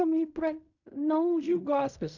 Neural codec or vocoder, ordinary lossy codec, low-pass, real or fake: codec, 16 kHz in and 24 kHz out, 0.4 kbps, LongCat-Audio-Codec, fine tuned four codebook decoder; none; 7.2 kHz; fake